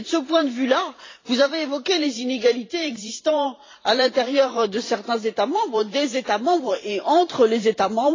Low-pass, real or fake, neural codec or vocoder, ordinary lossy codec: 7.2 kHz; fake; vocoder, 44.1 kHz, 128 mel bands every 512 samples, BigVGAN v2; AAC, 32 kbps